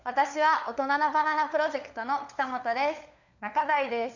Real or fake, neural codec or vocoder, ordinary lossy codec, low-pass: fake; codec, 16 kHz, 4 kbps, FunCodec, trained on LibriTTS, 50 frames a second; none; 7.2 kHz